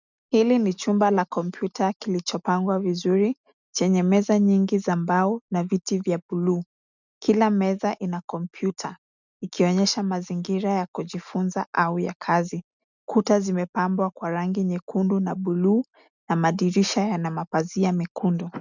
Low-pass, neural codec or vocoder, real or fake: 7.2 kHz; none; real